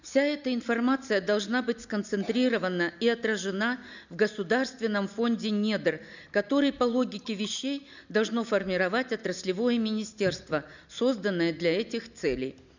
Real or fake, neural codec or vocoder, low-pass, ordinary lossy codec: real; none; 7.2 kHz; none